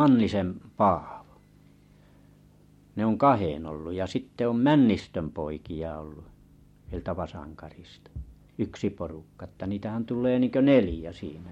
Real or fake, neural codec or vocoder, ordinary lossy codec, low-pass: real; none; MP3, 64 kbps; 19.8 kHz